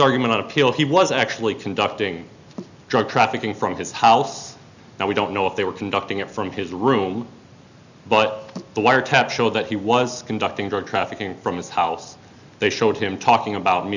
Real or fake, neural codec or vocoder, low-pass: real; none; 7.2 kHz